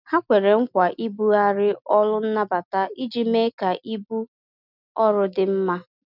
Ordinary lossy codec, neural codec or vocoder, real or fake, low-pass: none; none; real; 5.4 kHz